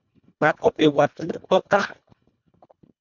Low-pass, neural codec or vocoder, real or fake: 7.2 kHz; codec, 24 kHz, 1.5 kbps, HILCodec; fake